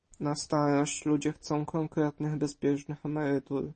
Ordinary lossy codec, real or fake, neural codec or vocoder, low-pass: MP3, 32 kbps; real; none; 10.8 kHz